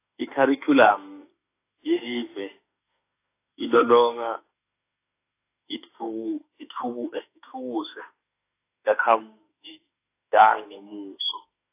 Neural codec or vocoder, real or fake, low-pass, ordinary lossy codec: autoencoder, 48 kHz, 32 numbers a frame, DAC-VAE, trained on Japanese speech; fake; 3.6 kHz; AAC, 24 kbps